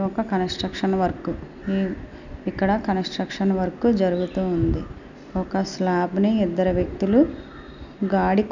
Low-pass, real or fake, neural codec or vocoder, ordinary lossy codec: 7.2 kHz; real; none; none